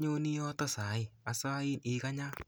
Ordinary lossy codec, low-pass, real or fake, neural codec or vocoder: none; none; real; none